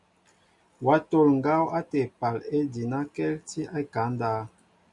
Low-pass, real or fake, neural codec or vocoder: 10.8 kHz; real; none